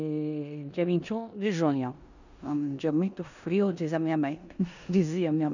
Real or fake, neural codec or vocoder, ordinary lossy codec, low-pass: fake; codec, 16 kHz in and 24 kHz out, 0.9 kbps, LongCat-Audio-Codec, four codebook decoder; none; 7.2 kHz